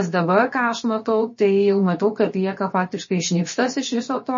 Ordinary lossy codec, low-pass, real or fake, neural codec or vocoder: MP3, 32 kbps; 7.2 kHz; fake; codec, 16 kHz, 0.7 kbps, FocalCodec